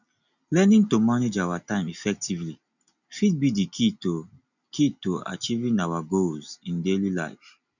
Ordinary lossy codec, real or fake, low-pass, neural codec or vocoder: none; real; 7.2 kHz; none